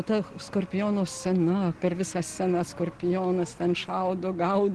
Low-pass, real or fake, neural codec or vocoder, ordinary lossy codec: 10.8 kHz; real; none; Opus, 16 kbps